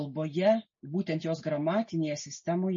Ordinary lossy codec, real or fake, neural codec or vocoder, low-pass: MP3, 32 kbps; real; none; 7.2 kHz